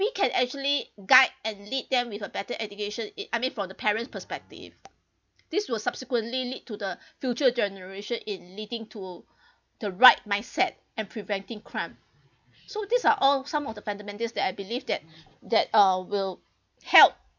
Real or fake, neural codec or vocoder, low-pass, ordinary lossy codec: real; none; 7.2 kHz; none